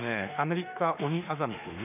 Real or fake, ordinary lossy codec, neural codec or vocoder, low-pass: fake; none; autoencoder, 48 kHz, 32 numbers a frame, DAC-VAE, trained on Japanese speech; 3.6 kHz